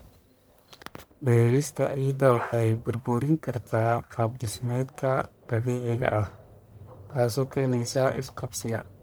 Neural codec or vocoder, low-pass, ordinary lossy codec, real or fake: codec, 44.1 kHz, 1.7 kbps, Pupu-Codec; none; none; fake